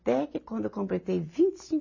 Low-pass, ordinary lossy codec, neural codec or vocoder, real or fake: 7.2 kHz; MP3, 32 kbps; none; real